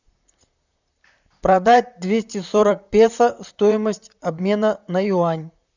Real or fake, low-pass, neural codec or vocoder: fake; 7.2 kHz; vocoder, 44.1 kHz, 128 mel bands, Pupu-Vocoder